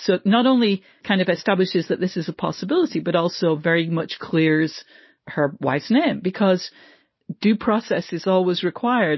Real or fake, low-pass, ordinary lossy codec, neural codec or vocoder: real; 7.2 kHz; MP3, 24 kbps; none